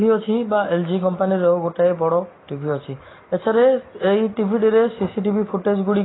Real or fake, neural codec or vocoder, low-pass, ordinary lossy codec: real; none; 7.2 kHz; AAC, 16 kbps